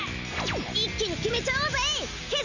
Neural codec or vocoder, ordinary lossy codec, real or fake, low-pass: none; none; real; 7.2 kHz